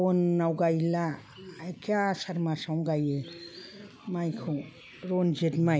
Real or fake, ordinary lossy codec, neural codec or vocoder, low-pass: real; none; none; none